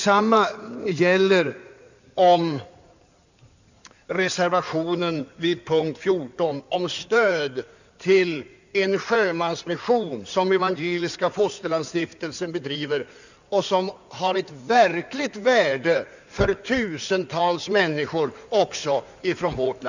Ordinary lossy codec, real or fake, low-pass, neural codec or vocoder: none; fake; 7.2 kHz; codec, 16 kHz in and 24 kHz out, 2.2 kbps, FireRedTTS-2 codec